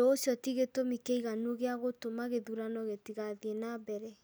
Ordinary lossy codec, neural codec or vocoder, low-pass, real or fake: none; none; none; real